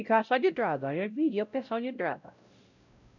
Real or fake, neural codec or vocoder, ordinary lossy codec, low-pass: fake; codec, 16 kHz, 0.5 kbps, X-Codec, WavLM features, trained on Multilingual LibriSpeech; none; 7.2 kHz